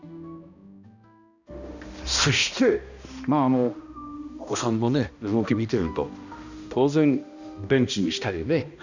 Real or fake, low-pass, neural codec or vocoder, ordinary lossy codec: fake; 7.2 kHz; codec, 16 kHz, 1 kbps, X-Codec, HuBERT features, trained on balanced general audio; none